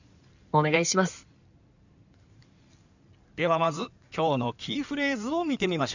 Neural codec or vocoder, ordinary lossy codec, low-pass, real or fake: codec, 16 kHz in and 24 kHz out, 2.2 kbps, FireRedTTS-2 codec; none; 7.2 kHz; fake